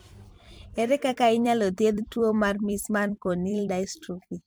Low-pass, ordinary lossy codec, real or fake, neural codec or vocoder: none; none; fake; vocoder, 44.1 kHz, 128 mel bands every 256 samples, BigVGAN v2